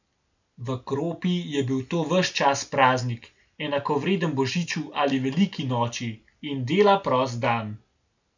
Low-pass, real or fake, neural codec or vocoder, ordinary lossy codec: 7.2 kHz; real; none; none